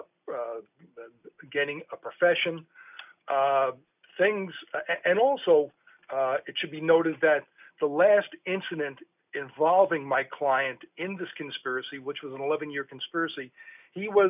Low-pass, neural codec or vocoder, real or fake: 3.6 kHz; none; real